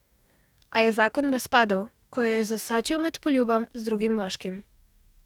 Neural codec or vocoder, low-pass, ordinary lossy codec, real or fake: codec, 44.1 kHz, 2.6 kbps, DAC; 19.8 kHz; none; fake